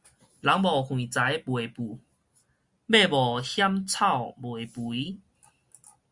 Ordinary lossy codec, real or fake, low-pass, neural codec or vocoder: Opus, 64 kbps; real; 10.8 kHz; none